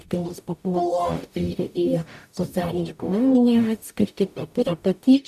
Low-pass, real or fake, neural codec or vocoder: 14.4 kHz; fake; codec, 44.1 kHz, 0.9 kbps, DAC